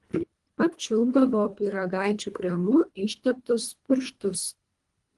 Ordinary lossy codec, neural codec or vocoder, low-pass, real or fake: Opus, 24 kbps; codec, 24 kHz, 1.5 kbps, HILCodec; 10.8 kHz; fake